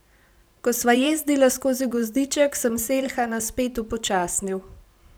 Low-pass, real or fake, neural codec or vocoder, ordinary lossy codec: none; fake; vocoder, 44.1 kHz, 128 mel bands every 512 samples, BigVGAN v2; none